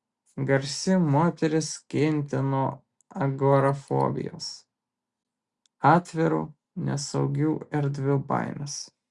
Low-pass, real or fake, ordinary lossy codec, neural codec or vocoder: 10.8 kHz; real; Opus, 64 kbps; none